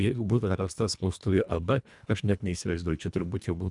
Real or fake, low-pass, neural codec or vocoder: fake; 10.8 kHz; codec, 24 kHz, 1.5 kbps, HILCodec